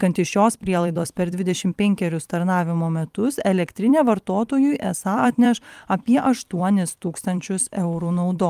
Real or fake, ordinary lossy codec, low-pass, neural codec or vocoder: fake; Opus, 32 kbps; 14.4 kHz; vocoder, 44.1 kHz, 128 mel bands every 256 samples, BigVGAN v2